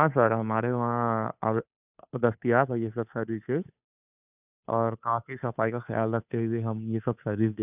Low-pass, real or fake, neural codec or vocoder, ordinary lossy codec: 3.6 kHz; fake; codec, 16 kHz, 2 kbps, FunCodec, trained on Chinese and English, 25 frames a second; none